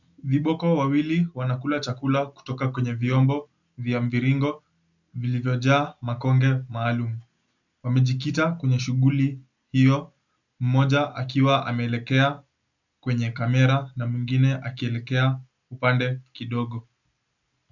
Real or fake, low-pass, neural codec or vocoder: real; 7.2 kHz; none